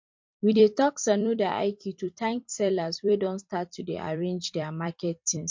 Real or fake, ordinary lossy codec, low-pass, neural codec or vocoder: fake; MP3, 48 kbps; 7.2 kHz; vocoder, 44.1 kHz, 128 mel bands every 256 samples, BigVGAN v2